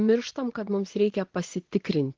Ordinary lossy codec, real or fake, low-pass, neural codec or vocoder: Opus, 16 kbps; fake; 7.2 kHz; vocoder, 24 kHz, 100 mel bands, Vocos